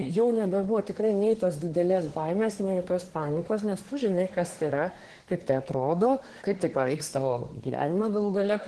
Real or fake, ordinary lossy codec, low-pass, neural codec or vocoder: fake; Opus, 16 kbps; 10.8 kHz; codec, 24 kHz, 1 kbps, SNAC